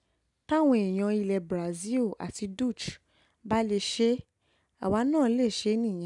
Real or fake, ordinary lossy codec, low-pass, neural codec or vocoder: real; none; 10.8 kHz; none